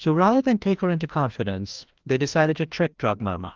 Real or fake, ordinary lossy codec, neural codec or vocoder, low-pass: fake; Opus, 32 kbps; codec, 16 kHz, 1 kbps, FreqCodec, larger model; 7.2 kHz